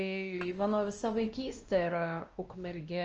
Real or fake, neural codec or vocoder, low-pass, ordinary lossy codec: fake; codec, 16 kHz, 1 kbps, X-Codec, WavLM features, trained on Multilingual LibriSpeech; 7.2 kHz; Opus, 24 kbps